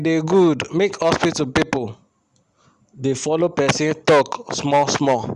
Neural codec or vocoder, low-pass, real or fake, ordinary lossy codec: none; 10.8 kHz; real; Opus, 64 kbps